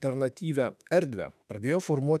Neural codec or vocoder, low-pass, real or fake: autoencoder, 48 kHz, 32 numbers a frame, DAC-VAE, trained on Japanese speech; 14.4 kHz; fake